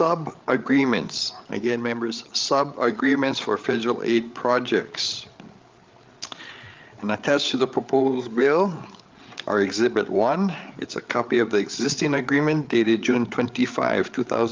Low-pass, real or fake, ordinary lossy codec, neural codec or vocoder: 7.2 kHz; fake; Opus, 24 kbps; codec, 16 kHz, 8 kbps, FreqCodec, larger model